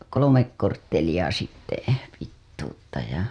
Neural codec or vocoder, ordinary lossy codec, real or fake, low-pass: none; none; real; none